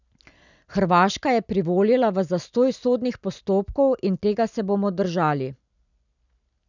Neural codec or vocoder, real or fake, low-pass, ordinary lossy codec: none; real; 7.2 kHz; none